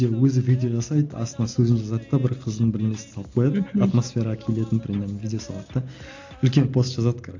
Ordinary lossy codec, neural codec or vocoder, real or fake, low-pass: AAC, 48 kbps; none; real; 7.2 kHz